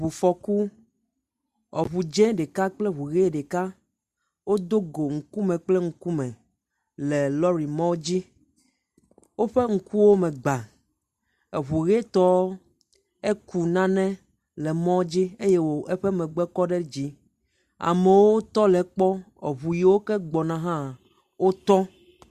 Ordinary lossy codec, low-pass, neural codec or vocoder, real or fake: Opus, 64 kbps; 14.4 kHz; none; real